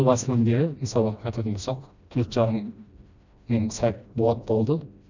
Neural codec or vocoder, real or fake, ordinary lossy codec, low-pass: codec, 16 kHz, 1 kbps, FreqCodec, smaller model; fake; none; 7.2 kHz